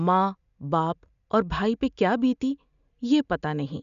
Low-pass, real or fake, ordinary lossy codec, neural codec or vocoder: 7.2 kHz; real; none; none